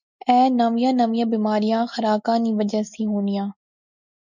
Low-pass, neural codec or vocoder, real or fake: 7.2 kHz; none; real